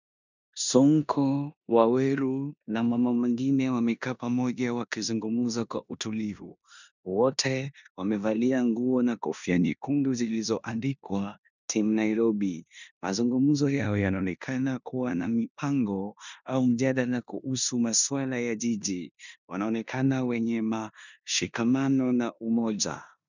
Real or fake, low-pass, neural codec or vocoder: fake; 7.2 kHz; codec, 16 kHz in and 24 kHz out, 0.9 kbps, LongCat-Audio-Codec, four codebook decoder